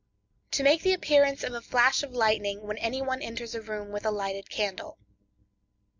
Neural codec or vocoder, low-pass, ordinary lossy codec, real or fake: none; 7.2 kHz; MP3, 64 kbps; real